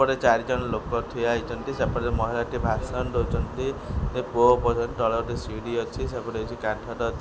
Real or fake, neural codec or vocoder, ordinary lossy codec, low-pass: real; none; none; none